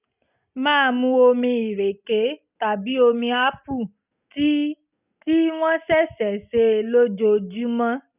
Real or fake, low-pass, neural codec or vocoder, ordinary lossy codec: real; 3.6 kHz; none; none